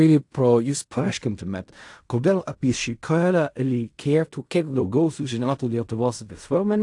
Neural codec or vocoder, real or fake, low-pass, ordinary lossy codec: codec, 16 kHz in and 24 kHz out, 0.4 kbps, LongCat-Audio-Codec, fine tuned four codebook decoder; fake; 10.8 kHz; AAC, 64 kbps